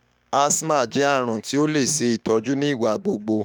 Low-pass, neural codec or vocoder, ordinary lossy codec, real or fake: none; autoencoder, 48 kHz, 32 numbers a frame, DAC-VAE, trained on Japanese speech; none; fake